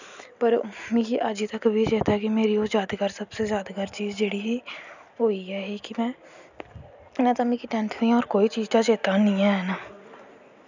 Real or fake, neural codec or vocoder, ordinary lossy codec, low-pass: real; none; none; 7.2 kHz